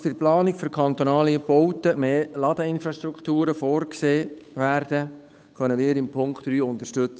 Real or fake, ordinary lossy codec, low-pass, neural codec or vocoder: fake; none; none; codec, 16 kHz, 8 kbps, FunCodec, trained on Chinese and English, 25 frames a second